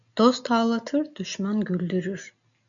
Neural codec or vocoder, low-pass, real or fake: none; 7.2 kHz; real